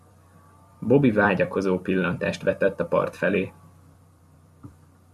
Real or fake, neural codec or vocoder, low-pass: fake; vocoder, 44.1 kHz, 128 mel bands every 256 samples, BigVGAN v2; 14.4 kHz